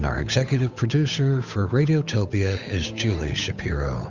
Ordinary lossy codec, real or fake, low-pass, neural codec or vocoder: Opus, 64 kbps; fake; 7.2 kHz; codec, 16 kHz, 8 kbps, FunCodec, trained on Chinese and English, 25 frames a second